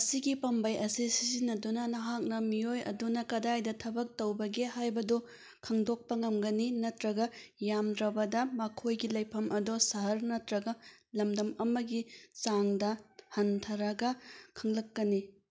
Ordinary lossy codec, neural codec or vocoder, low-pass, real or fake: none; none; none; real